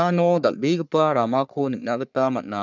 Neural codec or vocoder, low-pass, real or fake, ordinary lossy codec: codec, 44.1 kHz, 3.4 kbps, Pupu-Codec; 7.2 kHz; fake; none